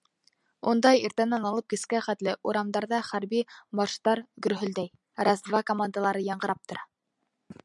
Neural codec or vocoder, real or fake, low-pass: none; real; 10.8 kHz